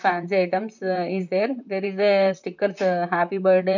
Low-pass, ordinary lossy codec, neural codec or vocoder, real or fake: 7.2 kHz; none; vocoder, 44.1 kHz, 128 mel bands, Pupu-Vocoder; fake